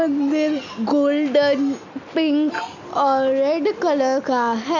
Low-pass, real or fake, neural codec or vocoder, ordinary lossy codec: 7.2 kHz; real; none; none